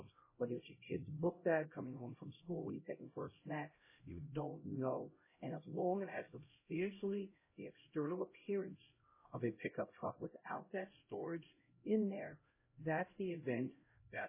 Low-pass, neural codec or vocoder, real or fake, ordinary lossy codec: 3.6 kHz; codec, 16 kHz, 0.5 kbps, X-Codec, HuBERT features, trained on LibriSpeech; fake; MP3, 16 kbps